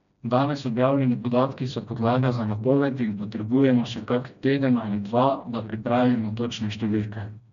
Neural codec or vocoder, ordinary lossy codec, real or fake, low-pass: codec, 16 kHz, 1 kbps, FreqCodec, smaller model; none; fake; 7.2 kHz